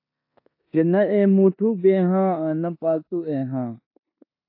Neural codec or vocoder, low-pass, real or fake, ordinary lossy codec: codec, 16 kHz in and 24 kHz out, 0.9 kbps, LongCat-Audio-Codec, four codebook decoder; 5.4 kHz; fake; AAC, 32 kbps